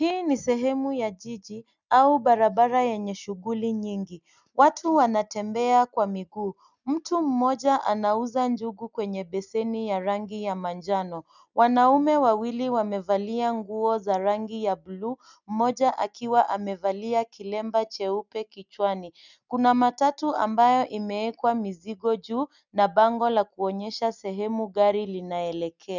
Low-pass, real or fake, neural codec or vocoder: 7.2 kHz; real; none